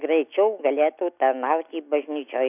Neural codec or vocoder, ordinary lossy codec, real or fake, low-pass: none; AAC, 32 kbps; real; 3.6 kHz